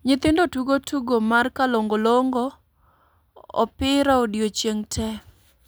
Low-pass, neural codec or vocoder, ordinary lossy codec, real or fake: none; none; none; real